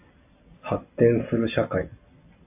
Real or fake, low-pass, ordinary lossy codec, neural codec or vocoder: real; 3.6 kHz; AAC, 32 kbps; none